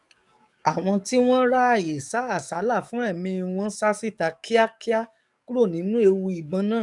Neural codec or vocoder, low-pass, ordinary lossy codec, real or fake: codec, 44.1 kHz, 7.8 kbps, DAC; 10.8 kHz; none; fake